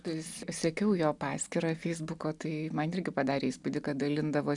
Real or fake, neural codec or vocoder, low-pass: real; none; 10.8 kHz